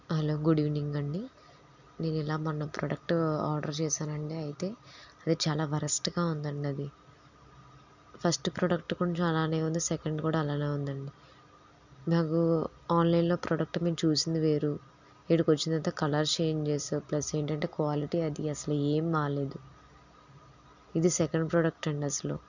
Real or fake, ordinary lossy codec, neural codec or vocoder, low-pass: real; none; none; 7.2 kHz